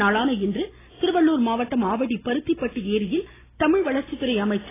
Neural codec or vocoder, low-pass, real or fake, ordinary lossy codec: none; 3.6 kHz; real; AAC, 16 kbps